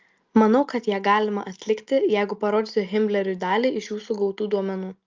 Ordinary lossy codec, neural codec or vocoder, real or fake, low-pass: Opus, 24 kbps; none; real; 7.2 kHz